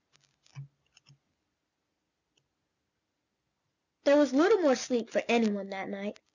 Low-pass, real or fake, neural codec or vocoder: 7.2 kHz; real; none